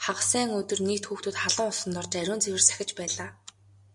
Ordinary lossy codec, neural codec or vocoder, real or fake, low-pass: MP3, 64 kbps; none; real; 10.8 kHz